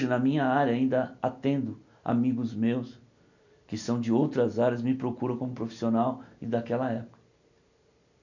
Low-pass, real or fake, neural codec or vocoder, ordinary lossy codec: 7.2 kHz; real; none; none